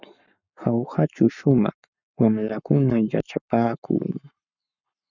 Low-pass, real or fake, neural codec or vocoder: 7.2 kHz; fake; codec, 44.1 kHz, 7.8 kbps, Pupu-Codec